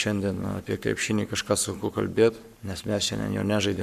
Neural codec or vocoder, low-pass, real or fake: codec, 44.1 kHz, 7.8 kbps, Pupu-Codec; 14.4 kHz; fake